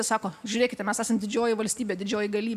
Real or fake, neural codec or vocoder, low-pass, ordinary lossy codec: real; none; 14.4 kHz; AAC, 96 kbps